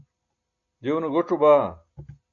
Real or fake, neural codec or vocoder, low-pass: real; none; 7.2 kHz